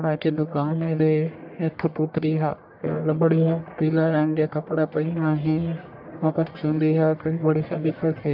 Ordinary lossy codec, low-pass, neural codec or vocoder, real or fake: none; 5.4 kHz; codec, 44.1 kHz, 1.7 kbps, Pupu-Codec; fake